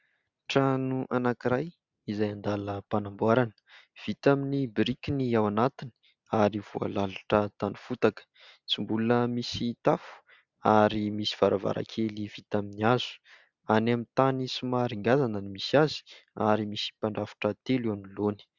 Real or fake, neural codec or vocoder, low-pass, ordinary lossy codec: real; none; 7.2 kHz; Opus, 64 kbps